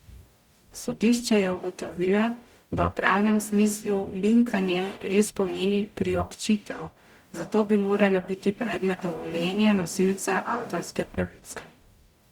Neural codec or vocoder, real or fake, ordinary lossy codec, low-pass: codec, 44.1 kHz, 0.9 kbps, DAC; fake; Opus, 64 kbps; 19.8 kHz